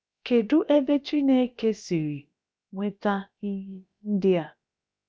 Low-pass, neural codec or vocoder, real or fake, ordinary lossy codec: none; codec, 16 kHz, about 1 kbps, DyCAST, with the encoder's durations; fake; none